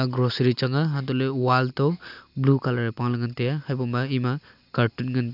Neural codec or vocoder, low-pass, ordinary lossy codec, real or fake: none; 5.4 kHz; AAC, 48 kbps; real